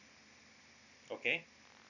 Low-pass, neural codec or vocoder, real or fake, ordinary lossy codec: 7.2 kHz; none; real; none